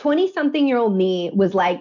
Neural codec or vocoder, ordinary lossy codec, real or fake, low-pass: none; MP3, 48 kbps; real; 7.2 kHz